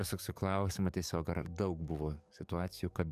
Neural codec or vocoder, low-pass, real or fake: codec, 44.1 kHz, 7.8 kbps, DAC; 14.4 kHz; fake